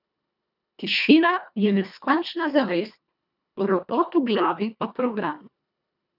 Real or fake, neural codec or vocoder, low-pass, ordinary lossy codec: fake; codec, 24 kHz, 1.5 kbps, HILCodec; 5.4 kHz; none